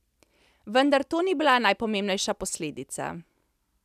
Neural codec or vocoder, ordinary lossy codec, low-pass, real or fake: none; none; 14.4 kHz; real